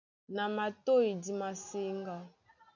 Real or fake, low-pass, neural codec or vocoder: real; 7.2 kHz; none